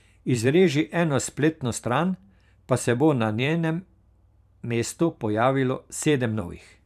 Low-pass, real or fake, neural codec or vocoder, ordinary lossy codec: 14.4 kHz; fake; vocoder, 44.1 kHz, 128 mel bands every 512 samples, BigVGAN v2; none